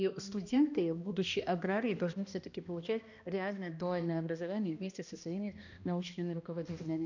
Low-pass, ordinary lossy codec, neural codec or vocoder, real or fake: 7.2 kHz; none; codec, 16 kHz, 1 kbps, X-Codec, HuBERT features, trained on balanced general audio; fake